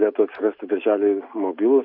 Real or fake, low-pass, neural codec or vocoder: real; 5.4 kHz; none